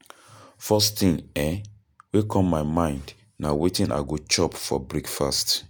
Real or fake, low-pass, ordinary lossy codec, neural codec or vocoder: real; none; none; none